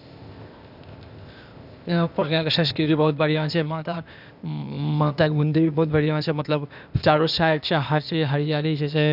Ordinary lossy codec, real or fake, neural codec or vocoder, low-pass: none; fake; codec, 16 kHz, 0.8 kbps, ZipCodec; 5.4 kHz